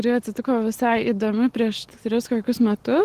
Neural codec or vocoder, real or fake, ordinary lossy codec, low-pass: none; real; Opus, 16 kbps; 14.4 kHz